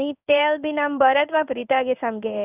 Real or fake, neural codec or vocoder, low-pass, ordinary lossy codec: fake; codec, 16 kHz in and 24 kHz out, 1 kbps, XY-Tokenizer; 3.6 kHz; none